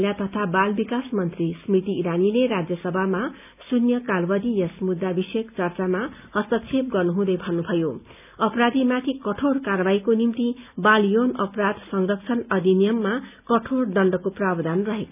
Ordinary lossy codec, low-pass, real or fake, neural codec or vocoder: none; 3.6 kHz; real; none